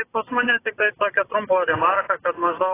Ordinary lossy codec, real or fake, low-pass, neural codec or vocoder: AAC, 16 kbps; fake; 3.6 kHz; vocoder, 24 kHz, 100 mel bands, Vocos